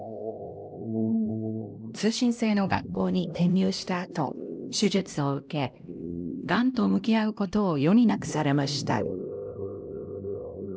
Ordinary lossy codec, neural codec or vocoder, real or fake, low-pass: none; codec, 16 kHz, 1 kbps, X-Codec, HuBERT features, trained on LibriSpeech; fake; none